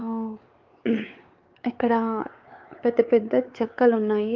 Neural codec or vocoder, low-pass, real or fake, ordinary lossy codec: codec, 16 kHz, 4 kbps, X-Codec, WavLM features, trained on Multilingual LibriSpeech; 7.2 kHz; fake; Opus, 24 kbps